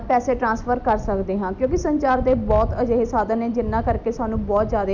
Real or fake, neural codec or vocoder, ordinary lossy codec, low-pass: real; none; none; 7.2 kHz